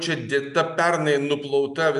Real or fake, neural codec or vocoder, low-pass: real; none; 10.8 kHz